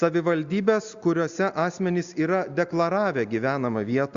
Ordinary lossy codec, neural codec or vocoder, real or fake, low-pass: Opus, 64 kbps; none; real; 7.2 kHz